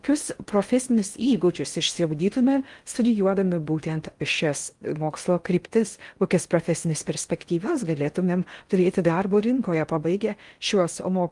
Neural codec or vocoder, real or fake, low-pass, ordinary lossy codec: codec, 16 kHz in and 24 kHz out, 0.6 kbps, FocalCodec, streaming, 4096 codes; fake; 10.8 kHz; Opus, 32 kbps